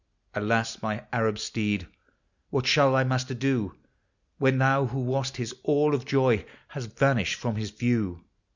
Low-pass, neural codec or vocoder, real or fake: 7.2 kHz; none; real